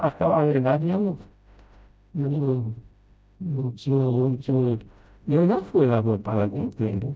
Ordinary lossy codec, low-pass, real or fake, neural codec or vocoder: none; none; fake; codec, 16 kHz, 0.5 kbps, FreqCodec, smaller model